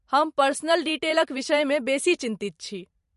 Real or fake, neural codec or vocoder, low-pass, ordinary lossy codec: fake; vocoder, 44.1 kHz, 128 mel bands every 512 samples, BigVGAN v2; 14.4 kHz; MP3, 48 kbps